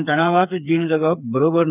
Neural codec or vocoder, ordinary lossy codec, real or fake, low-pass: codec, 16 kHz, 4 kbps, FreqCodec, smaller model; none; fake; 3.6 kHz